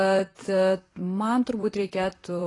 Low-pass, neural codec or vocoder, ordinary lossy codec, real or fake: 10.8 kHz; vocoder, 44.1 kHz, 128 mel bands, Pupu-Vocoder; AAC, 32 kbps; fake